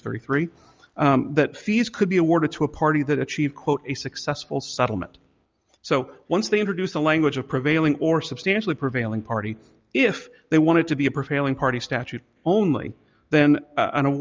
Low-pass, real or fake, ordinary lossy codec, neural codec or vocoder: 7.2 kHz; real; Opus, 24 kbps; none